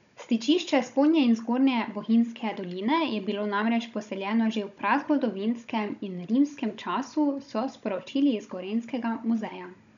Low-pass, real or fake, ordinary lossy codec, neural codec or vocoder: 7.2 kHz; fake; none; codec, 16 kHz, 16 kbps, FunCodec, trained on Chinese and English, 50 frames a second